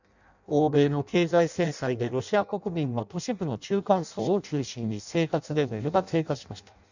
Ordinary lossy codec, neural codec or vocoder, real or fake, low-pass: none; codec, 16 kHz in and 24 kHz out, 0.6 kbps, FireRedTTS-2 codec; fake; 7.2 kHz